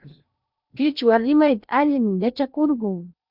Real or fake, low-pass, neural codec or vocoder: fake; 5.4 kHz; codec, 16 kHz in and 24 kHz out, 0.8 kbps, FocalCodec, streaming, 65536 codes